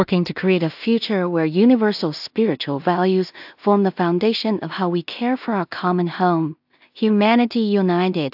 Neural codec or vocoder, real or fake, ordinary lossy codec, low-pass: codec, 16 kHz in and 24 kHz out, 0.4 kbps, LongCat-Audio-Codec, two codebook decoder; fake; AAC, 48 kbps; 5.4 kHz